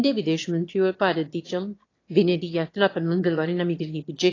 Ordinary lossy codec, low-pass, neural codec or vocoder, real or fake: AAC, 32 kbps; 7.2 kHz; autoencoder, 22.05 kHz, a latent of 192 numbers a frame, VITS, trained on one speaker; fake